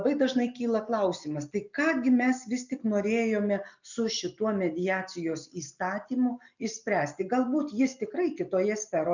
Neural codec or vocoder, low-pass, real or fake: none; 7.2 kHz; real